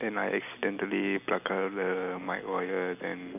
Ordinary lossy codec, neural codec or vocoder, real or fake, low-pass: none; none; real; 3.6 kHz